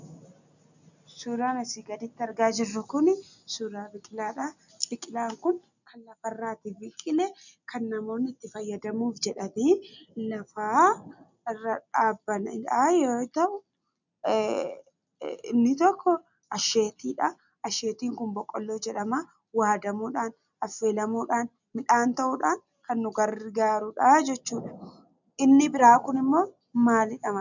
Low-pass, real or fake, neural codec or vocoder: 7.2 kHz; real; none